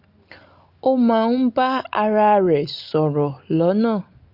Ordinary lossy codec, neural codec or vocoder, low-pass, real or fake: Opus, 32 kbps; none; 5.4 kHz; real